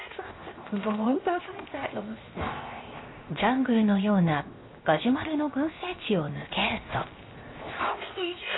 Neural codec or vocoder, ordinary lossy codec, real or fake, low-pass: codec, 16 kHz, 0.7 kbps, FocalCodec; AAC, 16 kbps; fake; 7.2 kHz